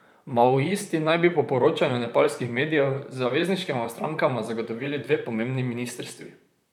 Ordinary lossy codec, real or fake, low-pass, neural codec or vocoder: none; fake; 19.8 kHz; vocoder, 44.1 kHz, 128 mel bands, Pupu-Vocoder